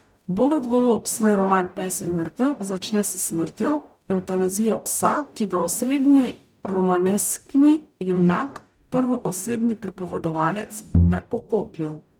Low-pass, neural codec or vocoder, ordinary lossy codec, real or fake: none; codec, 44.1 kHz, 0.9 kbps, DAC; none; fake